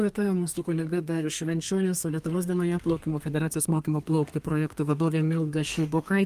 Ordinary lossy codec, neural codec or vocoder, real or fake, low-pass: Opus, 32 kbps; codec, 32 kHz, 1.9 kbps, SNAC; fake; 14.4 kHz